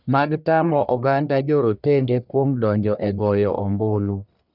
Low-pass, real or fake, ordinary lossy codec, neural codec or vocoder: 5.4 kHz; fake; none; codec, 44.1 kHz, 1.7 kbps, Pupu-Codec